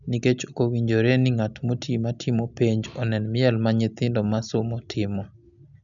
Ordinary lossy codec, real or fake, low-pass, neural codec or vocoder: none; real; 7.2 kHz; none